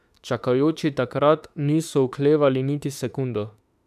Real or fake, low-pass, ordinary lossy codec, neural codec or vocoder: fake; 14.4 kHz; none; autoencoder, 48 kHz, 32 numbers a frame, DAC-VAE, trained on Japanese speech